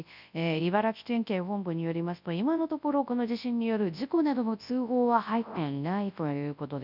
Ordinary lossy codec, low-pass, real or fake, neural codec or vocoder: none; 5.4 kHz; fake; codec, 24 kHz, 0.9 kbps, WavTokenizer, large speech release